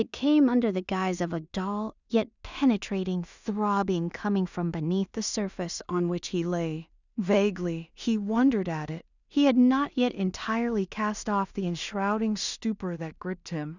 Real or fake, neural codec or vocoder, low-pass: fake; codec, 16 kHz in and 24 kHz out, 0.4 kbps, LongCat-Audio-Codec, two codebook decoder; 7.2 kHz